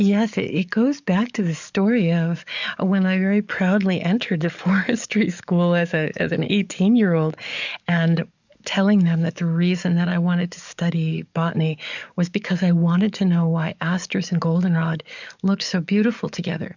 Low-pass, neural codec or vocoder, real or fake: 7.2 kHz; codec, 44.1 kHz, 7.8 kbps, DAC; fake